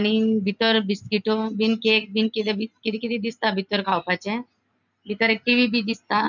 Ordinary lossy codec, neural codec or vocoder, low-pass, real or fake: none; none; 7.2 kHz; real